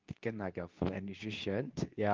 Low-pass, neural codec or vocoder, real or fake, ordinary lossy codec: 7.2 kHz; codec, 24 kHz, 0.9 kbps, WavTokenizer, medium speech release version 2; fake; Opus, 24 kbps